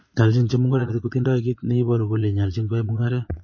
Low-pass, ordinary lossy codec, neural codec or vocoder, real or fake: 7.2 kHz; MP3, 32 kbps; vocoder, 22.05 kHz, 80 mel bands, Vocos; fake